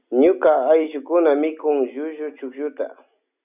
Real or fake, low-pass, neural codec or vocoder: real; 3.6 kHz; none